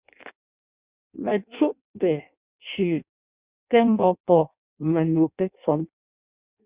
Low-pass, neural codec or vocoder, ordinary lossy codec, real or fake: 3.6 kHz; codec, 16 kHz in and 24 kHz out, 0.6 kbps, FireRedTTS-2 codec; Opus, 64 kbps; fake